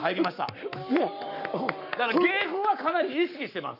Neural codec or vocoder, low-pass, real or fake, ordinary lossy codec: codec, 44.1 kHz, 7.8 kbps, Pupu-Codec; 5.4 kHz; fake; none